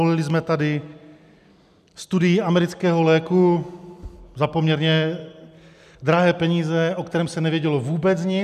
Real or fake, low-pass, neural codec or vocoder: real; 14.4 kHz; none